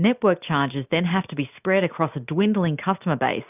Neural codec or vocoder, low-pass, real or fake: none; 3.6 kHz; real